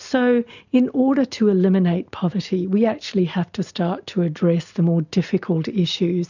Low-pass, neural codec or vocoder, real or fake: 7.2 kHz; none; real